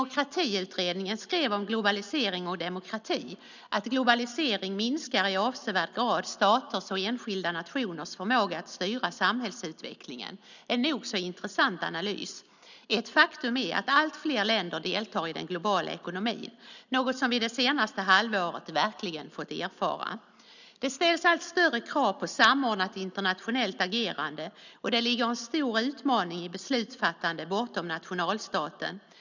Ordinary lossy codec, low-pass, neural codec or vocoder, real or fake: none; 7.2 kHz; none; real